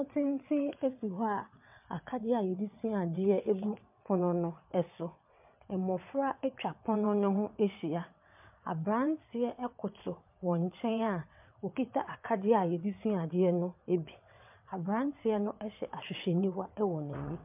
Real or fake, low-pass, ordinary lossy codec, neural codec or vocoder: fake; 3.6 kHz; MP3, 32 kbps; vocoder, 22.05 kHz, 80 mel bands, WaveNeXt